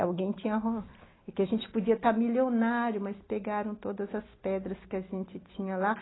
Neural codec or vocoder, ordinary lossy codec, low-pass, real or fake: none; AAC, 16 kbps; 7.2 kHz; real